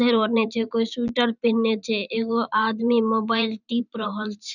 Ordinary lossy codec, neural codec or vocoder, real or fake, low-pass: none; vocoder, 44.1 kHz, 128 mel bands every 512 samples, BigVGAN v2; fake; 7.2 kHz